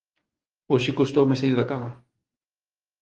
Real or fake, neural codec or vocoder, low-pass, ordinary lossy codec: fake; codec, 44.1 kHz, 7.8 kbps, DAC; 10.8 kHz; Opus, 24 kbps